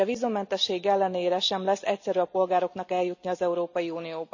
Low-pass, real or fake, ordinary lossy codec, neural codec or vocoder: 7.2 kHz; real; none; none